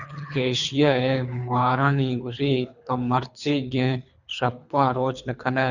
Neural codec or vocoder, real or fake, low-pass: codec, 24 kHz, 3 kbps, HILCodec; fake; 7.2 kHz